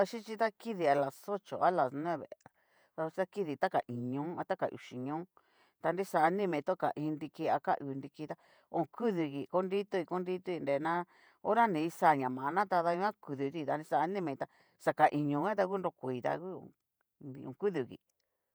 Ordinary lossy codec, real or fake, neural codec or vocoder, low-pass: none; fake; vocoder, 48 kHz, 128 mel bands, Vocos; none